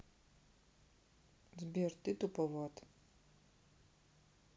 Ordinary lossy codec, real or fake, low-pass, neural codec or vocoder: none; real; none; none